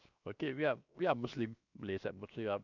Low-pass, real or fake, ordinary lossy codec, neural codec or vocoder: 7.2 kHz; fake; none; codec, 16 kHz, 0.7 kbps, FocalCodec